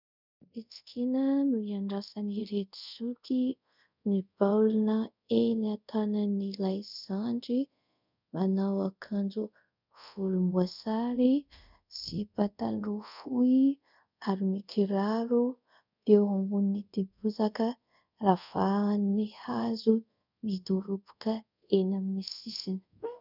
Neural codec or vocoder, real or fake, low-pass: codec, 24 kHz, 0.5 kbps, DualCodec; fake; 5.4 kHz